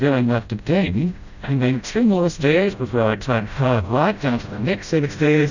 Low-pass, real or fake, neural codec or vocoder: 7.2 kHz; fake; codec, 16 kHz, 0.5 kbps, FreqCodec, smaller model